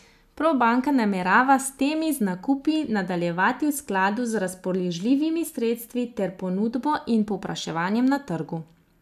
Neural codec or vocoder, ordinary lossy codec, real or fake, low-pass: none; none; real; 14.4 kHz